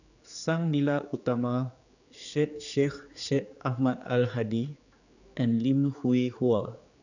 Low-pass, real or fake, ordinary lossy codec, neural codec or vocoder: 7.2 kHz; fake; none; codec, 16 kHz, 4 kbps, X-Codec, HuBERT features, trained on general audio